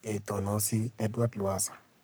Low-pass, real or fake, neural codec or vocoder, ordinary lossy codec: none; fake; codec, 44.1 kHz, 3.4 kbps, Pupu-Codec; none